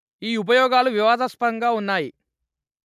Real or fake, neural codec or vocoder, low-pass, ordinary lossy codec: real; none; 14.4 kHz; none